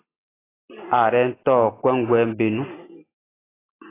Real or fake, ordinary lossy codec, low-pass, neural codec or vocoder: real; AAC, 16 kbps; 3.6 kHz; none